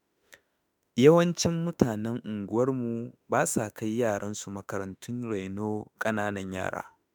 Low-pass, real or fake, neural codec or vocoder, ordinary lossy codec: none; fake; autoencoder, 48 kHz, 32 numbers a frame, DAC-VAE, trained on Japanese speech; none